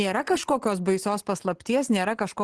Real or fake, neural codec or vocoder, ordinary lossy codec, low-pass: real; none; Opus, 16 kbps; 10.8 kHz